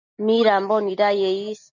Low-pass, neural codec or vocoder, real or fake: 7.2 kHz; none; real